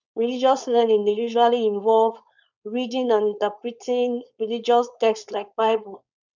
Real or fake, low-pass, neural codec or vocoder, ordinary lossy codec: fake; 7.2 kHz; codec, 16 kHz, 4.8 kbps, FACodec; none